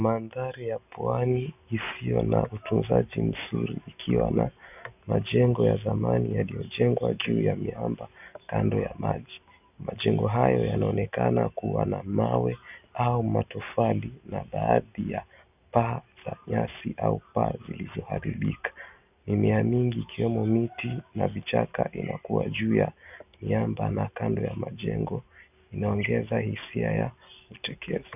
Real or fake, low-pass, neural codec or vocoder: real; 3.6 kHz; none